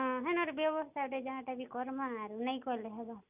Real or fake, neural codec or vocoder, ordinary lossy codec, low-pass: real; none; none; 3.6 kHz